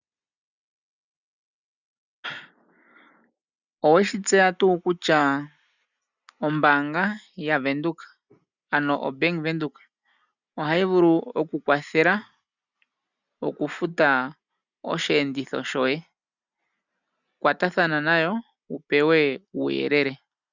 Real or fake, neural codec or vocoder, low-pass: real; none; 7.2 kHz